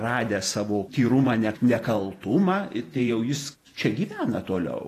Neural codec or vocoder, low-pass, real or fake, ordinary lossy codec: vocoder, 48 kHz, 128 mel bands, Vocos; 14.4 kHz; fake; AAC, 48 kbps